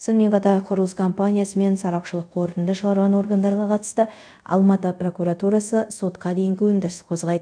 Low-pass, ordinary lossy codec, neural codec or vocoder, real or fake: 9.9 kHz; none; codec, 24 kHz, 0.5 kbps, DualCodec; fake